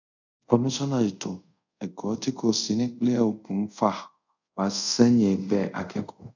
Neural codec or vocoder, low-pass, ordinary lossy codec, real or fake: codec, 24 kHz, 0.5 kbps, DualCodec; 7.2 kHz; none; fake